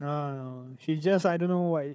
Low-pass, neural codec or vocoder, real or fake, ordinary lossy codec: none; codec, 16 kHz, 4 kbps, FunCodec, trained on Chinese and English, 50 frames a second; fake; none